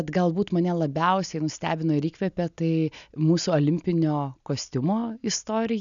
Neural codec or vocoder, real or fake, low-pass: none; real; 7.2 kHz